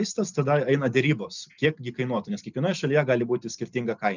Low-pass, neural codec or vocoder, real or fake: 7.2 kHz; none; real